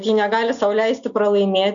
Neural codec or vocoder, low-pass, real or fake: none; 7.2 kHz; real